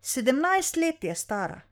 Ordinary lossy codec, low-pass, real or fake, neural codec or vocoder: none; none; fake; codec, 44.1 kHz, 7.8 kbps, Pupu-Codec